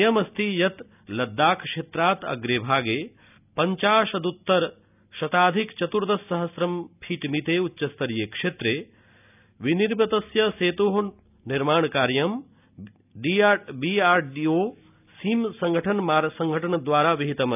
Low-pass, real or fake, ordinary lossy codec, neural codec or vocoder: 3.6 kHz; real; none; none